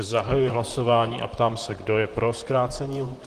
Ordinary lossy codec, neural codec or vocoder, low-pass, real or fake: Opus, 24 kbps; vocoder, 44.1 kHz, 128 mel bands, Pupu-Vocoder; 14.4 kHz; fake